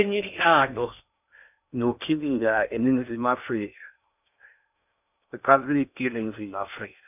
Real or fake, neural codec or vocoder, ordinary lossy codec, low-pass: fake; codec, 16 kHz in and 24 kHz out, 0.6 kbps, FocalCodec, streaming, 2048 codes; none; 3.6 kHz